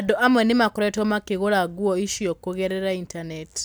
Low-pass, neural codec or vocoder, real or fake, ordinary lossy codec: none; none; real; none